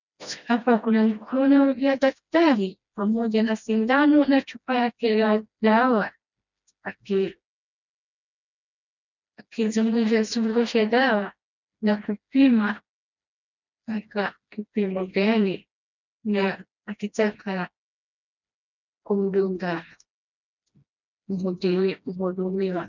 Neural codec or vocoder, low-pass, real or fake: codec, 16 kHz, 1 kbps, FreqCodec, smaller model; 7.2 kHz; fake